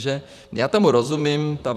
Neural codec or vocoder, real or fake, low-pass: vocoder, 44.1 kHz, 128 mel bands, Pupu-Vocoder; fake; 14.4 kHz